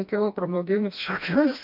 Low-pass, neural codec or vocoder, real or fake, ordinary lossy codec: 5.4 kHz; codec, 16 kHz, 1 kbps, FreqCodec, smaller model; fake; MP3, 48 kbps